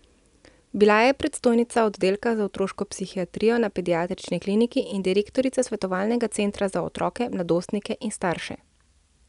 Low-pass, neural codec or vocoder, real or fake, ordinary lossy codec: 10.8 kHz; none; real; none